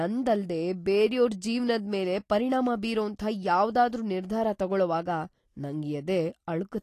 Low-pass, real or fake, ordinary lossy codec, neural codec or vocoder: 14.4 kHz; real; AAC, 48 kbps; none